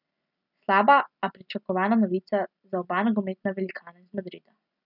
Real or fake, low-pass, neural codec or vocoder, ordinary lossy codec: real; 5.4 kHz; none; none